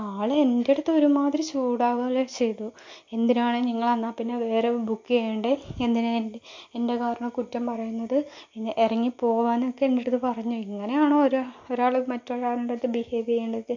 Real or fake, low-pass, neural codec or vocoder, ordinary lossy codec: real; 7.2 kHz; none; MP3, 48 kbps